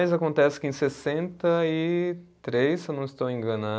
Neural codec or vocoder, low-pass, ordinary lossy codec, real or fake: none; none; none; real